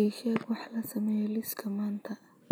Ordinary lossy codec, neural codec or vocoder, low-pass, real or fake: none; none; none; real